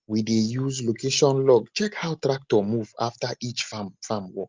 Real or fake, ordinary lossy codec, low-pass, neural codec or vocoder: real; Opus, 24 kbps; 7.2 kHz; none